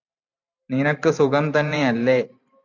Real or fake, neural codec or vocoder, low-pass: fake; vocoder, 44.1 kHz, 128 mel bands every 512 samples, BigVGAN v2; 7.2 kHz